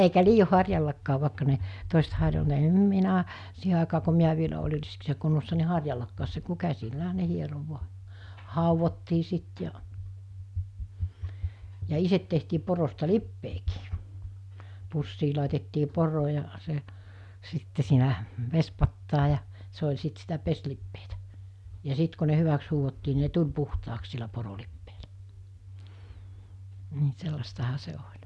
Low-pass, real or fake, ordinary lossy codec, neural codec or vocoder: none; real; none; none